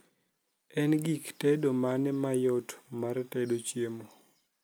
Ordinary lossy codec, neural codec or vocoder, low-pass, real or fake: none; none; none; real